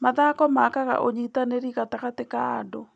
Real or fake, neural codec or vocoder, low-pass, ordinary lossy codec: real; none; none; none